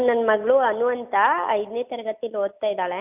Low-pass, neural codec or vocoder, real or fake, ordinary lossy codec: 3.6 kHz; none; real; none